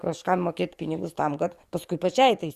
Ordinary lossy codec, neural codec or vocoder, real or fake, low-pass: Opus, 64 kbps; codec, 44.1 kHz, 7.8 kbps, DAC; fake; 14.4 kHz